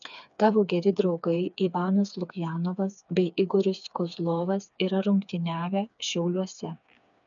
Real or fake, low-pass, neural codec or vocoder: fake; 7.2 kHz; codec, 16 kHz, 4 kbps, FreqCodec, smaller model